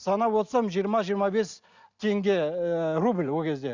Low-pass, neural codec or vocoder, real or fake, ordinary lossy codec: 7.2 kHz; none; real; Opus, 64 kbps